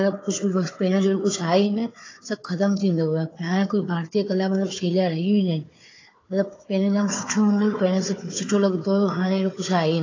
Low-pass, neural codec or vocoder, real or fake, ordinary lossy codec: 7.2 kHz; codec, 16 kHz, 4 kbps, FunCodec, trained on Chinese and English, 50 frames a second; fake; AAC, 32 kbps